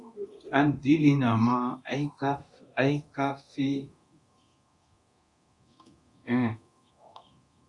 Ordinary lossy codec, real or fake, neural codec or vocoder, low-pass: Opus, 64 kbps; fake; codec, 24 kHz, 0.9 kbps, DualCodec; 10.8 kHz